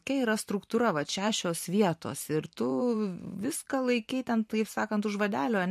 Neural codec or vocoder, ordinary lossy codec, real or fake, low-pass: vocoder, 44.1 kHz, 128 mel bands every 512 samples, BigVGAN v2; MP3, 64 kbps; fake; 14.4 kHz